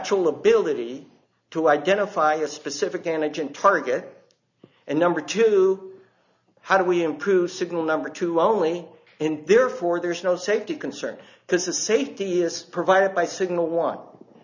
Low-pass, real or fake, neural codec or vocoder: 7.2 kHz; real; none